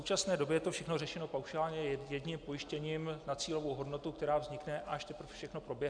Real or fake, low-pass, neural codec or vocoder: real; 9.9 kHz; none